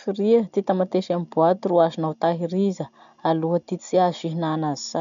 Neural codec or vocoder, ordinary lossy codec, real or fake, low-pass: none; none; real; 7.2 kHz